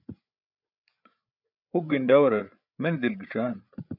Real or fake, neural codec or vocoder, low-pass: fake; codec, 16 kHz, 8 kbps, FreqCodec, larger model; 5.4 kHz